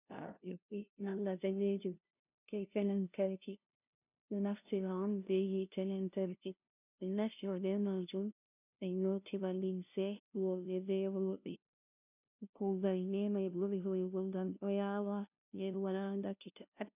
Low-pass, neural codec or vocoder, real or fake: 3.6 kHz; codec, 16 kHz, 0.5 kbps, FunCodec, trained on LibriTTS, 25 frames a second; fake